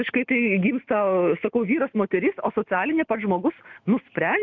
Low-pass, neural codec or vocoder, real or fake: 7.2 kHz; none; real